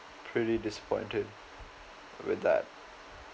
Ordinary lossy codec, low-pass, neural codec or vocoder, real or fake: none; none; none; real